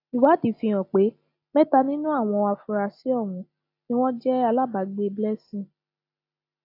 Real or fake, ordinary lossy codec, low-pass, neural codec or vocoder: real; AAC, 32 kbps; 5.4 kHz; none